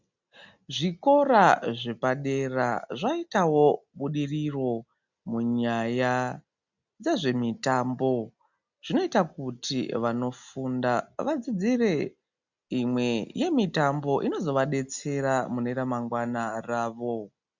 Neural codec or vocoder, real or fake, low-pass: none; real; 7.2 kHz